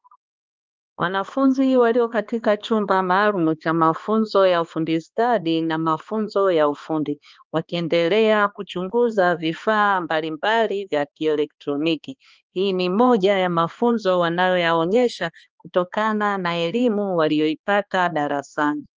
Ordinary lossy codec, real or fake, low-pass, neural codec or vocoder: Opus, 24 kbps; fake; 7.2 kHz; codec, 16 kHz, 2 kbps, X-Codec, HuBERT features, trained on balanced general audio